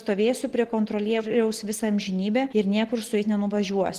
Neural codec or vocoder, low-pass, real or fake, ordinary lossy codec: none; 14.4 kHz; real; Opus, 16 kbps